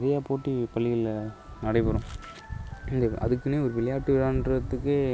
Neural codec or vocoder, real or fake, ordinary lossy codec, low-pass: none; real; none; none